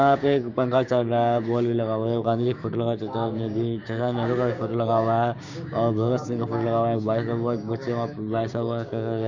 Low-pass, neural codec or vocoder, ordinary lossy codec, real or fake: 7.2 kHz; codec, 16 kHz, 6 kbps, DAC; Opus, 64 kbps; fake